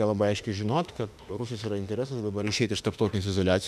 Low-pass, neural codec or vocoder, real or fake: 14.4 kHz; autoencoder, 48 kHz, 32 numbers a frame, DAC-VAE, trained on Japanese speech; fake